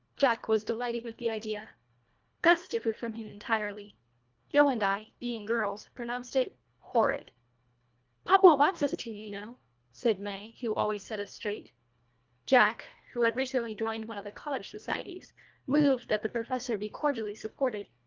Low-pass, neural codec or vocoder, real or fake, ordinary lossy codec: 7.2 kHz; codec, 24 kHz, 1.5 kbps, HILCodec; fake; Opus, 24 kbps